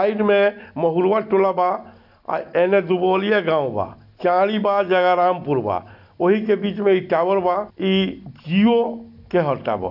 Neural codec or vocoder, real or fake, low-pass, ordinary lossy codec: none; real; 5.4 kHz; none